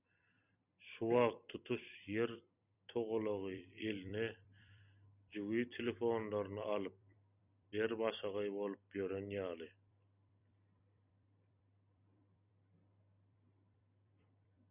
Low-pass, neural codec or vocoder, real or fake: 3.6 kHz; vocoder, 44.1 kHz, 128 mel bands every 256 samples, BigVGAN v2; fake